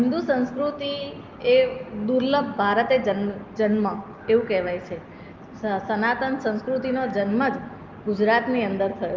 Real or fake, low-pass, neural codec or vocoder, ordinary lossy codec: real; 7.2 kHz; none; Opus, 32 kbps